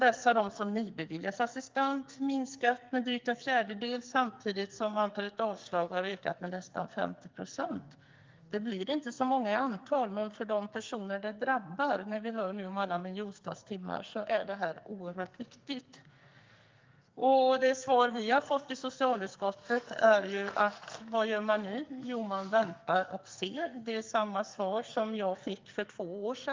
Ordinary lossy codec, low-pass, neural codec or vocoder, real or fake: Opus, 24 kbps; 7.2 kHz; codec, 44.1 kHz, 2.6 kbps, SNAC; fake